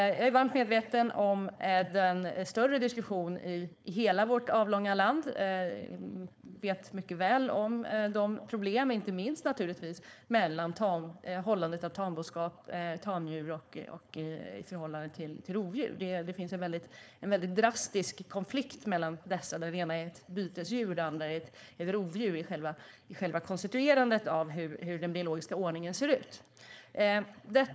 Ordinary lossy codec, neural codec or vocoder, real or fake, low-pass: none; codec, 16 kHz, 4.8 kbps, FACodec; fake; none